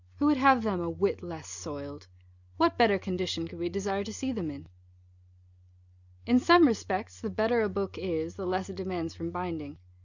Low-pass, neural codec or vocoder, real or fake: 7.2 kHz; none; real